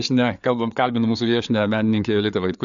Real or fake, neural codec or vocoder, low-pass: fake; codec, 16 kHz, 4 kbps, FreqCodec, larger model; 7.2 kHz